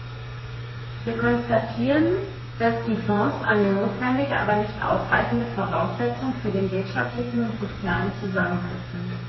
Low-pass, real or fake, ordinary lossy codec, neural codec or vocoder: 7.2 kHz; fake; MP3, 24 kbps; codec, 32 kHz, 1.9 kbps, SNAC